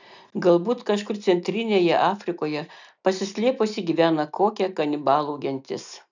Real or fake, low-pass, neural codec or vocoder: real; 7.2 kHz; none